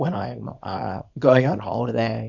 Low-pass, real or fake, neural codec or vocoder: 7.2 kHz; fake; codec, 24 kHz, 0.9 kbps, WavTokenizer, small release